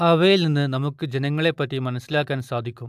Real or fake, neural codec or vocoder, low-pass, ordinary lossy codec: real; none; 14.4 kHz; AAC, 96 kbps